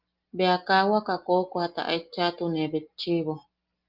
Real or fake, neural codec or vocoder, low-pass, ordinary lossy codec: real; none; 5.4 kHz; Opus, 32 kbps